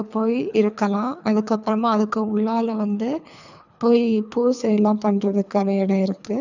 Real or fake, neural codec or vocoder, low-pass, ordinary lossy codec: fake; codec, 24 kHz, 3 kbps, HILCodec; 7.2 kHz; none